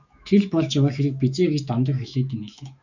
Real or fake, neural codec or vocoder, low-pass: fake; autoencoder, 48 kHz, 128 numbers a frame, DAC-VAE, trained on Japanese speech; 7.2 kHz